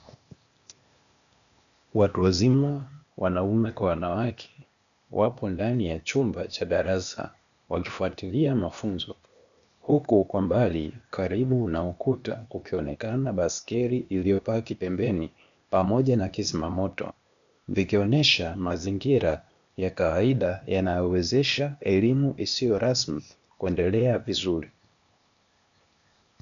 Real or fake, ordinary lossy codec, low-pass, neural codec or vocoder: fake; MP3, 96 kbps; 7.2 kHz; codec, 16 kHz, 0.8 kbps, ZipCodec